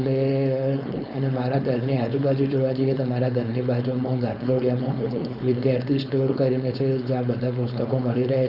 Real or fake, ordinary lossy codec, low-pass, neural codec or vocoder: fake; none; 5.4 kHz; codec, 16 kHz, 4.8 kbps, FACodec